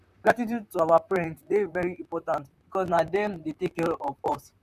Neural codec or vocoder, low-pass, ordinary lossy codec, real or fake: vocoder, 44.1 kHz, 128 mel bands, Pupu-Vocoder; 14.4 kHz; none; fake